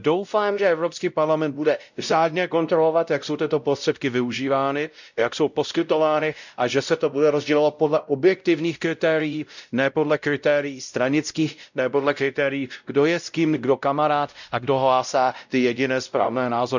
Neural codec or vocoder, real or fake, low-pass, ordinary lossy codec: codec, 16 kHz, 0.5 kbps, X-Codec, WavLM features, trained on Multilingual LibriSpeech; fake; 7.2 kHz; none